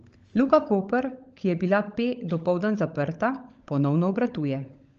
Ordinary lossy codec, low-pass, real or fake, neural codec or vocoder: Opus, 24 kbps; 7.2 kHz; fake; codec, 16 kHz, 16 kbps, FunCodec, trained on LibriTTS, 50 frames a second